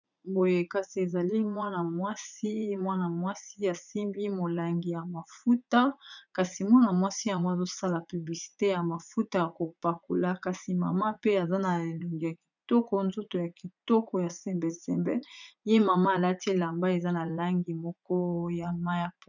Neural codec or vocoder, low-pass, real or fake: vocoder, 44.1 kHz, 80 mel bands, Vocos; 7.2 kHz; fake